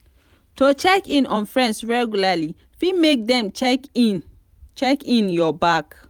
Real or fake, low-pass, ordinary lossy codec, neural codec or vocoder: fake; 19.8 kHz; none; vocoder, 44.1 kHz, 128 mel bands every 512 samples, BigVGAN v2